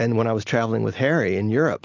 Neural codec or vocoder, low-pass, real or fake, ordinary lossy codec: none; 7.2 kHz; real; AAC, 48 kbps